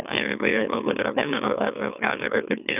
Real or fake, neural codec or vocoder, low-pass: fake; autoencoder, 44.1 kHz, a latent of 192 numbers a frame, MeloTTS; 3.6 kHz